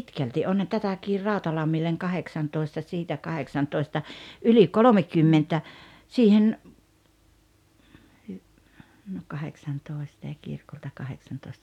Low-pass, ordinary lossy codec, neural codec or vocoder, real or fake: 19.8 kHz; none; none; real